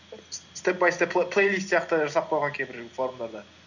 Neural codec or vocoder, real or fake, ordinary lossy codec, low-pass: none; real; none; 7.2 kHz